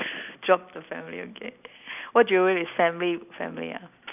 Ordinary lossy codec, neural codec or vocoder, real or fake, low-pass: none; none; real; 3.6 kHz